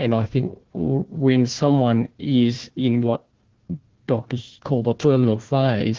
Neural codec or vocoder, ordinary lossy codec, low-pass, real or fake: codec, 16 kHz, 1 kbps, FunCodec, trained on Chinese and English, 50 frames a second; Opus, 16 kbps; 7.2 kHz; fake